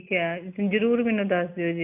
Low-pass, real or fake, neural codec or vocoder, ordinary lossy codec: 3.6 kHz; real; none; MP3, 32 kbps